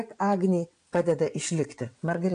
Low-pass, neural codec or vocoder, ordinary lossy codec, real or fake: 9.9 kHz; vocoder, 22.05 kHz, 80 mel bands, WaveNeXt; AAC, 48 kbps; fake